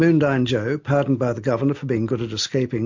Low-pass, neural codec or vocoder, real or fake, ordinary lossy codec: 7.2 kHz; none; real; MP3, 48 kbps